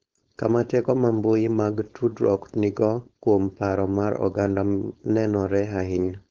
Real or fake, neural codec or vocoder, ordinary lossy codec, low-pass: fake; codec, 16 kHz, 4.8 kbps, FACodec; Opus, 16 kbps; 7.2 kHz